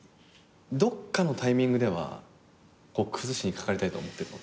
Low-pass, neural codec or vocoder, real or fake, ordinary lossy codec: none; none; real; none